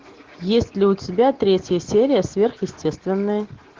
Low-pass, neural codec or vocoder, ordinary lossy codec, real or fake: 7.2 kHz; none; Opus, 16 kbps; real